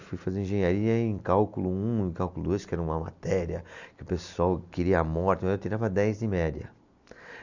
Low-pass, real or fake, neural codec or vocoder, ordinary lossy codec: 7.2 kHz; real; none; none